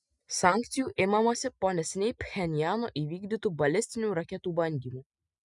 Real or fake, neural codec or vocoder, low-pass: real; none; 10.8 kHz